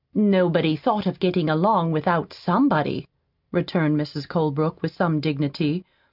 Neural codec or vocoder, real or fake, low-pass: none; real; 5.4 kHz